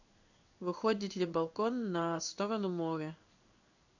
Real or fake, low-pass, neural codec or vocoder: fake; 7.2 kHz; codec, 16 kHz in and 24 kHz out, 1 kbps, XY-Tokenizer